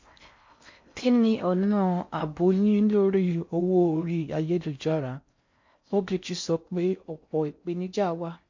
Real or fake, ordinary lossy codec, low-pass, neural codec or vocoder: fake; MP3, 48 kbps; 7.2 kHz; codec, 16 kHz in and 24 kHz out, 0.6 kbps, FocalCodec, streaming, 4096 codes